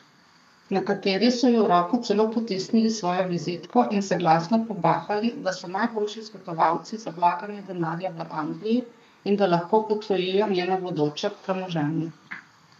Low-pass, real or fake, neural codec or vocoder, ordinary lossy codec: 14.4 kHz; fake; codec, 32 kHz, 1.9 kbps, SNAC; none